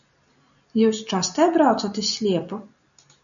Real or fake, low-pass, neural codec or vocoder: real; 7.2 kHz; none